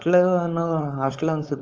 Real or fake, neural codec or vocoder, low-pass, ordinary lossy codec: fake; codec, 16 kHz, 4.8 kbps, FACodec; 7.2 kHz; Opus, 24 kbps